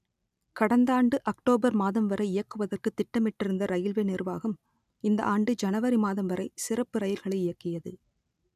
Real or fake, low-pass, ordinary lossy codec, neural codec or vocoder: real; 14.4 kHz; none; none